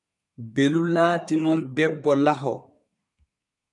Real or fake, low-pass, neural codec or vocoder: fake; 10.8 kHz; codec, 24 kHz, 1 kbps, SNAC